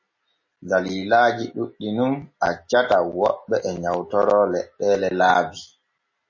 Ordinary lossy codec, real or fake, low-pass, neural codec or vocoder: MP3, 32 kbps; real; 7.2 kHz; none